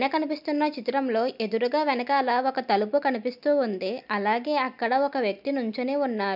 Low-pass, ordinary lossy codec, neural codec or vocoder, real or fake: 5.4 kHz; none; none; real